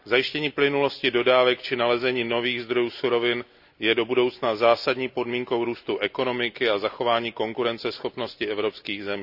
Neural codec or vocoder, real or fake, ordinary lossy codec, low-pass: none; real; none; 5.4 kHz